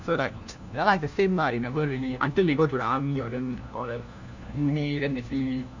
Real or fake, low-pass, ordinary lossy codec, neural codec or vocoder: fake; 7.2 kHz; none; codec, 16 kHz, 1 kbps, FunCodec, trained on LibriTTS, 50 frames a second